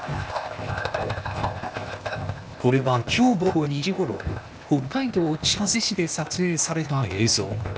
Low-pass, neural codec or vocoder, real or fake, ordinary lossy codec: none; codec, 16 kHz, 0.8 kbps, ZipCodec; fake; none